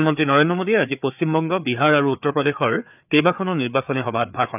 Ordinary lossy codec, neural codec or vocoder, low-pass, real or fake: none; codec, 16 kHz, 4 kbps, FreqCodec, larger model; 3.6 kHz; fake